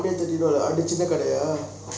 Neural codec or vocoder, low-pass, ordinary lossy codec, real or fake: none; none; none; real